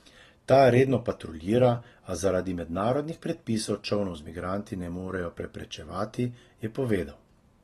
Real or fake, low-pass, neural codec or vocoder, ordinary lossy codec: real; 19.8 kHz; none; AAC, 32 kbps